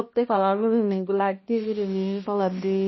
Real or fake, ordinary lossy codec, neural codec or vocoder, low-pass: fake; MP3, 24 kbps; codec, 16 kHz, 1 kbps, X-Codec, HuBERT features, trained on balanced general audio; 7.2 kHz